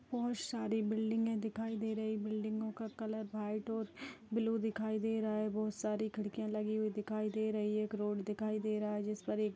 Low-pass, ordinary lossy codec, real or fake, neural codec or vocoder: none; none; real; none